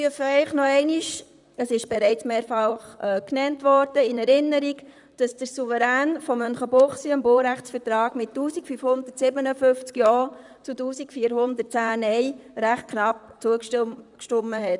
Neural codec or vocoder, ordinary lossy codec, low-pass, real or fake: vocoder, 44.1 kHz, 128 mel bands, Pupu-Vocoder; none; 10.8 kHz; fake